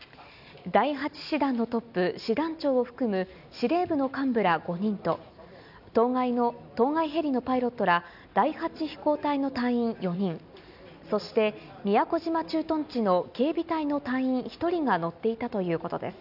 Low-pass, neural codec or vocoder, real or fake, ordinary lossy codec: 5.4 kHz; none; real; none